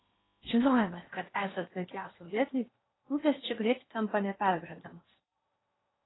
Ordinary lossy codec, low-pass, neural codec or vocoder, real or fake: AAC, 16 kbps; 7.2 kHz; codec, 16 kHz in and 24 kHz out, 0.8 kbps, FocalCodec, streaming, 65536 codes; fake